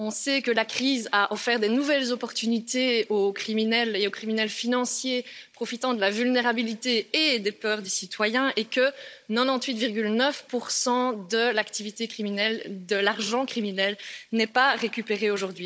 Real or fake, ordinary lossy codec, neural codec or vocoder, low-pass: fake; none; codec, 16 kHz, 16 kbps, FunCodec, trained on Chinese and English, 50 frames a second; none